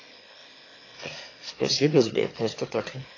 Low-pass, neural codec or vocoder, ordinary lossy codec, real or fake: 7.2 kHz; autoencoder, 22.05 kHz, a latent of 192 numbers a frame, VITS, trained on one speaker; AAC, 32 kbps; fake